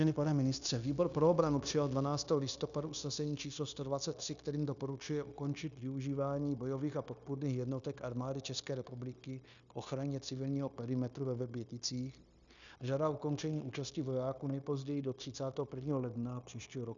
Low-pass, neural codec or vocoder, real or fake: 7.2 kHz; codec, 16 kHz, 0.9 kbps, LongCat-Audio-Codec; fake